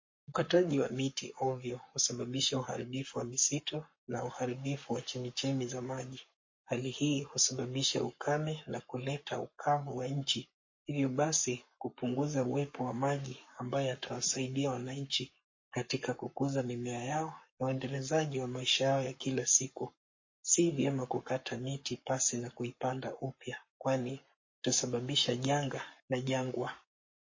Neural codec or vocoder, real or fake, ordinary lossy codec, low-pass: codec, 44.1 kHz, 7.8 kbps, Pupu-Codec; fake; MP3, 32 kbps; 7.2 kHz